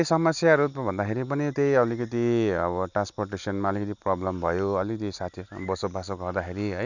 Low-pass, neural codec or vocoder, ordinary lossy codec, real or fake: 7.2 kHz; none; none; real